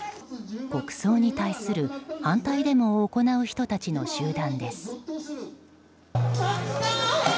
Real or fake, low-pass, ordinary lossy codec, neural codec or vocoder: real; none; none; none